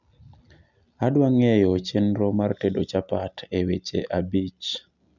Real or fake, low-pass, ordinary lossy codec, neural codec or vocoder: real; 7.2 kHz; none; none